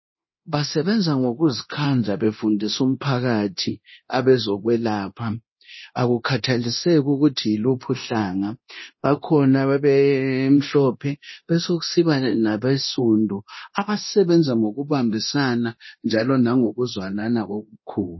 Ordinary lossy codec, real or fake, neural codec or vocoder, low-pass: MP3, 24 kbps; fake; codec, 24 kHz, 0.9 kbps, DualCodec; 7.2 kHz